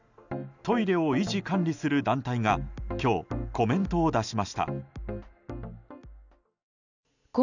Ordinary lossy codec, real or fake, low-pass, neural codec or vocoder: none; real; 7.2 kHz; none